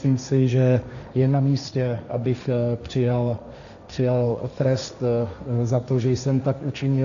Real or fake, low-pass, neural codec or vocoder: fake; 7.2 kHz; codec, 16 kHz, 1.1 kbps, Voila-Tokenizer